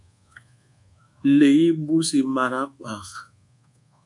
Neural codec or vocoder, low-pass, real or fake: codec, 24 kHz, 1.2 kbps, DualCodec; 10.8 kHz; fake